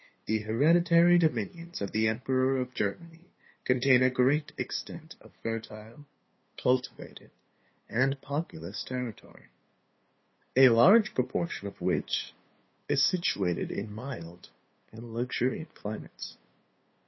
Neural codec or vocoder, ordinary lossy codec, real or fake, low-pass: codec, 16 kHz, 2 kbps, FunCodec, trained on LibriTTS, 25 frames a second; MP3, 24 kbps; fake; 7.2 kHz